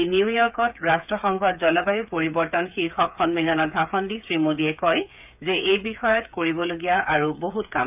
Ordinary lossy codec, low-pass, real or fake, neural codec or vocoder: none; 3.6 kHz; fake; codec, 16 kHz, 8 kbps, FreqCodec, smaller model